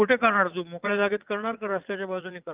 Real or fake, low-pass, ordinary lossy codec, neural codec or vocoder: fake; 3.6 kHz; Opus, 24 kbps; vocoder, 44.1 kHz, 80 mel bands, Vocos